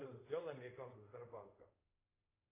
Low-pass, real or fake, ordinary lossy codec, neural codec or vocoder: 3.6 kHz; fake; AAC, 24 kbps; codec, 24 kHz, 0.5 kbps, DualCodec